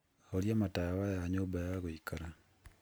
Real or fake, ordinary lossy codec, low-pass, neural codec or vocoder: real; none; none; none